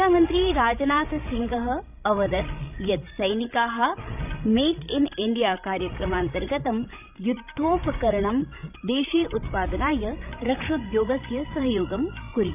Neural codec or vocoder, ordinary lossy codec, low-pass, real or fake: codec, 16 kHz, 16 kbps, FreqCodec, larger model; none; 3.6 kHz; fake